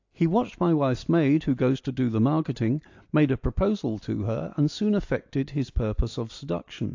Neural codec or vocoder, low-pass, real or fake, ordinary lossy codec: none; 7.2 kHz; real; AAC, 48 kbps